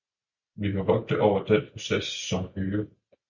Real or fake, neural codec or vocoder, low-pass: real; none; 7.2 kHz